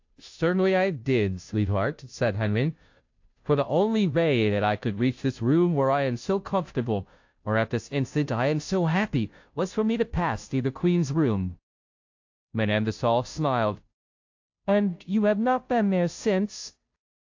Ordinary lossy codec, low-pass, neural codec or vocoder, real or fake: AAC, 48 kbps; 7.2 kHz; codec, 16 kHz, 0.5 kbps, FunCodec, trained on Chinese and English, 25 frames a second; fake